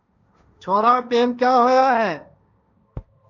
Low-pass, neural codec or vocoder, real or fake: 7.2 kHz; codec, 16 kHz, 1.1 kbps, Voila-Tokenizer; fake